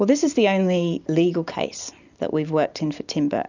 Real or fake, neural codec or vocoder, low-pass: real; none; 7.2 kHz